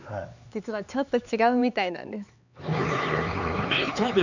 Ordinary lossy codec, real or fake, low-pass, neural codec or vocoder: none; fake; 7.2 kHz; codec, 16 kHz, 4 kbps, X-Codec, HuBERT features, trained on LibriSpeech